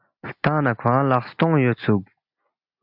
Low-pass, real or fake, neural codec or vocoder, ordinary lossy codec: 5.4 kHz; real; none; AAC, 48 kbps